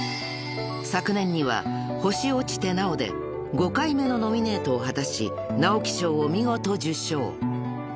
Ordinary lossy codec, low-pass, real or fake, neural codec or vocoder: none; none; real; none